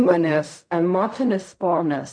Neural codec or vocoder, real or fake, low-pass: codec, 16 kHz in and 24 kHz out, 0.4 kbps, LongCat-Audio-Codec, fine tuned four codebook decoder; fake; 9.9 kHz